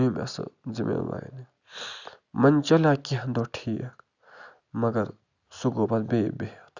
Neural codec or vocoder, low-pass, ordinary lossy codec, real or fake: none; 7.2 kHz; none; real